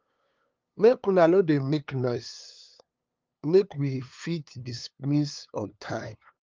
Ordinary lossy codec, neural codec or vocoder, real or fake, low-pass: Opus, 24 kbps; codec, 16 kHz, 2 kbps, FunCodec, trained on LibriTTS, 25 frames a second; fake; 7.2 kHz